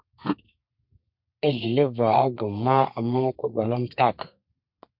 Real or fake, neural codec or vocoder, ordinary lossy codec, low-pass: fake; codec, 32 kHz, 1.9 kbps, SNAC; MP3, 48 kbps; 5.4 kHz